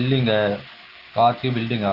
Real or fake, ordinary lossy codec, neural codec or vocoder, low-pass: real; Opus, 16 kbps; none; 5.4 kHz